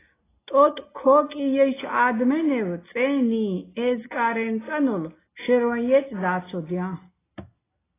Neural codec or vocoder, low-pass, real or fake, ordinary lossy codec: none; 3.6 kHz; real; AAC, 16 kbps